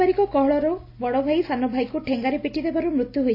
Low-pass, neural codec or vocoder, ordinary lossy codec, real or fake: 5.4 kHz; none; AAC, 32 kbps; real